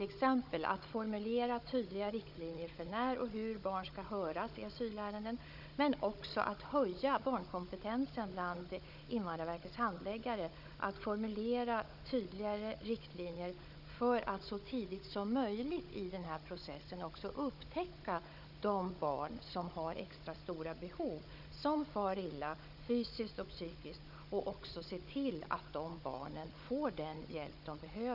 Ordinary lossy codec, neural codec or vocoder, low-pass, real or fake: none; codec, 16 kHz, 16 kbps, FunCodec, trained on Chinese and English, 50 frames a second; 5.4 kHz; fake